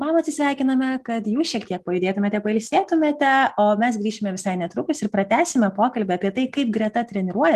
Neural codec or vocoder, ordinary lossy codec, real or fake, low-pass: none; Opus, 24 kbps; real; 14.4 kHz